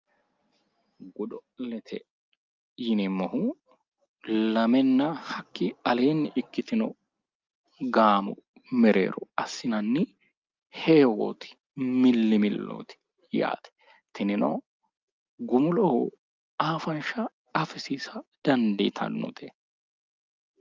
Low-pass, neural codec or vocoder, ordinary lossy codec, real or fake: 7.2 kHz; none; Opus, 24 kbps; real